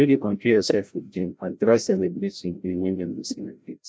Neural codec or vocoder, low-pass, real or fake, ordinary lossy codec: codec, 16 kHz, 0.5 kbps, FreqCodec, larger model; none; fake; none